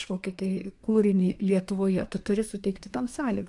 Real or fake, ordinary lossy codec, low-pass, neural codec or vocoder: fake; AAC, 64 kbps; 10.8 kHz; codec, 24 kHz, 1 kbps, SNAC